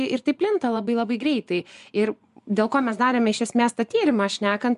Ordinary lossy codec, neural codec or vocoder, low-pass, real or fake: MP3, 96 kbps; vocoder, 24 kHz, 100 mel bands, Vocos; 10.8 kHz; fake